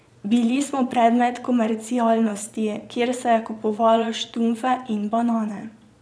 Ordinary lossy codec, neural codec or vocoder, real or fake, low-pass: none; vocoder, 22.05 kHz, 80 mel bands, Vocos; fake; none